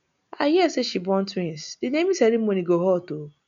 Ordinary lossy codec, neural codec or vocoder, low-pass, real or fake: none; none; 7.2 kHz; real